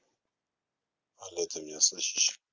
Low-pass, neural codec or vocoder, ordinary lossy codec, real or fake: 7.2 kHz; none; Opus, 32 kbps; real